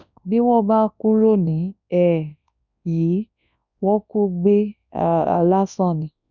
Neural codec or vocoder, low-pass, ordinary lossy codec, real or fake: codec, 24 kHz, 0.9 kbps, WavTokenizer, large speech release; 7.2 kHz; none; fake